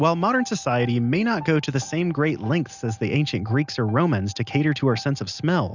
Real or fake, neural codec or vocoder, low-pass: real; none; 7.2 kHz